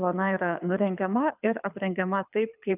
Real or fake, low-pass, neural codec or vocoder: fake; 3.6 kHz; codec, 24 kHz, 6 kbps, HILCodec